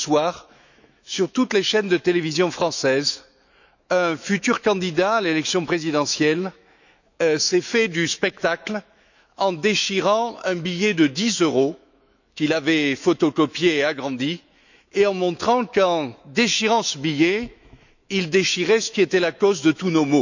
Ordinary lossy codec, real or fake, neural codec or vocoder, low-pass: none; fake; autoencoder, 48 kHz, 128 numbers a frame, DAC-VAE, trained on Japanese speech; 7.2 kHz